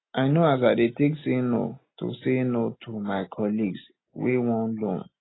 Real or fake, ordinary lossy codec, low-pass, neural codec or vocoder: real; AAC, 16 kbps; 7.2 kHz; none